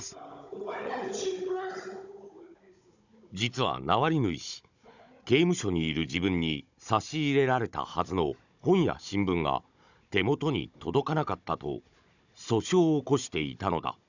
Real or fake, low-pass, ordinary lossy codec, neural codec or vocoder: fake; 7.2 kHz; none; codec, 16 kHz, 16 kbps, FunCodec, trained on Chinese and English, 50 frames a second